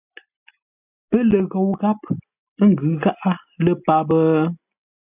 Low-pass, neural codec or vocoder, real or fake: 3.6 kHz; none; real